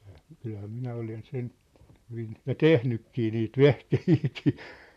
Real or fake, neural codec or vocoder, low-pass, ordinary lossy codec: fake; vocoder, 44.1 kHz, 128 mel bands, Pupu-Vocoder; 14.4 kHz; none